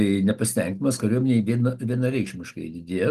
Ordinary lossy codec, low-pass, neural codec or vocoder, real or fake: Opus, 24 kbps; 14.4 kHz; none; real